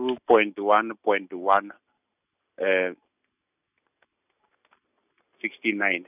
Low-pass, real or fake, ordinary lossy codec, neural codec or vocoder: 3.6 kHz; real; none; none